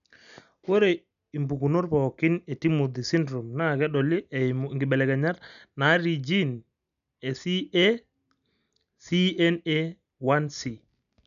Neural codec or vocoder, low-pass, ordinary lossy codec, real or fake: none; 7.2 kHz; none; real